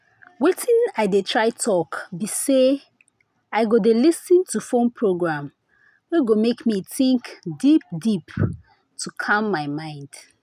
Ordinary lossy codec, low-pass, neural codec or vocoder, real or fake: none; 14.4 kHz; none; real